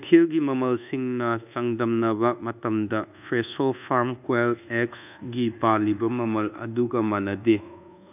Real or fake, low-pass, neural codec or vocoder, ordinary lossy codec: fake; 3.6 kHz; codec, 24 kHz, 1.2 kbps, DualCodec; none